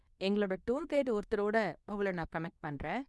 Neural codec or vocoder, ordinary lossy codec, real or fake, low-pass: codec, 24 kHz, 0.9 kbps, WavTokenizer, medium speech release version 2; none; fake; none